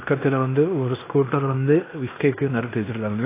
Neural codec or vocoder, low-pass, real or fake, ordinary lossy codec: codec, 16 kHz in and 24 kHz out, 0.9 kbps, LongCat-Audio-Codec, fine tuned four codebook decoder; 3.6 kHz; fake; AAC, 24 kbps